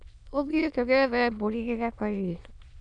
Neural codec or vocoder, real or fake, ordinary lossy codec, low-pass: autoencoder, 22.05 kHz, a latent of 192 numbers a frame, VITS, trained on many speakers; fake; none; 9.9 kHz